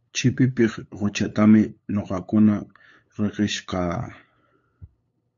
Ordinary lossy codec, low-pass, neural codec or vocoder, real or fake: AAC, 48 kbps; 7.2 kHz; codec, 16 kHz, 8 kbps, FunCodec, trained on LibriTTS, 25 frames a second; fake